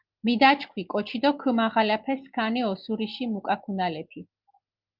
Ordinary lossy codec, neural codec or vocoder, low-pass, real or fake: Opus, 32 kbps; none; 5.4 kHz; real